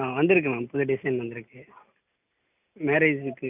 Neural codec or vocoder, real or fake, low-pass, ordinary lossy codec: none; real; 3.6 kHz; none